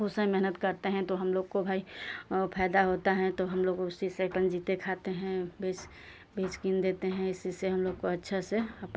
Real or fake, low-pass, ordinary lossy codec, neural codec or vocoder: real; none; none; none